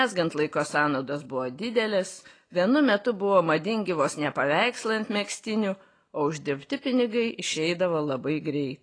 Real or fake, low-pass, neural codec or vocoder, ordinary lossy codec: real; 9.9 kHz; none; AAC, 32 kbps